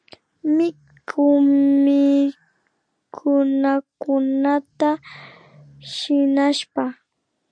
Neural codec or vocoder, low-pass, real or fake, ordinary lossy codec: none; 9.9 kHz; real; MP3, 64 kbps